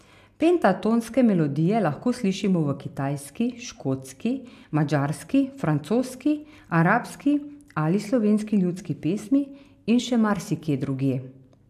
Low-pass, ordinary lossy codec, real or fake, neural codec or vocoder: 14.4 kHz; AAC, 96 kbps; real; none